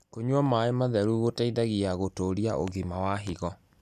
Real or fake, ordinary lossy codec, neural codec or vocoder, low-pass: real; none; none; 14.4 kHz